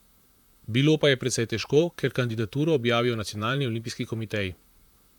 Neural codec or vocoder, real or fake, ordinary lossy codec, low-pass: vocoder, 44.1 kHz, 128 mel bands, Pupu-Vocoder; fake; MP3, 96 kbps; 19.8 kHz